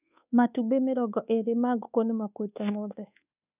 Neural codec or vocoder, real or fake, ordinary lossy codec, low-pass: codec, 24 kHz, 1.2 kbps, DualCodec; fake; none; 3.6 kHz